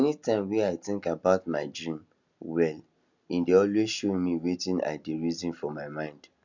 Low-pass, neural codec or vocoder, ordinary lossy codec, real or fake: 7.2 kHz; none; none; real